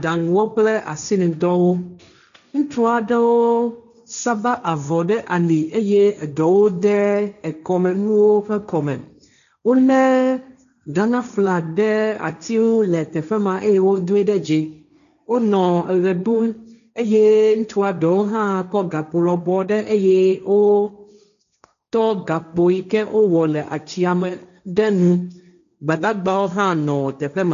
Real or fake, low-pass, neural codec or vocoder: fake; 7.2 kHz; codec, 16 kHz, 1.1 kbps, Voila-Tokenizer